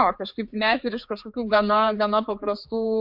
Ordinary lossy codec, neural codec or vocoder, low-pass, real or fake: MP3, 48 kbps; codec, 16 kHz, 4 kbps, X-Codec, HuBERT features, trained on general audio; 5.4 kHz; fake